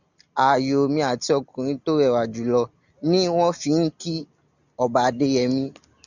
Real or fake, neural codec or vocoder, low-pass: real; none; 7.2 kHz